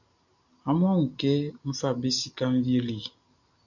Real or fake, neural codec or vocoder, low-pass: real; none; 7.2 kHz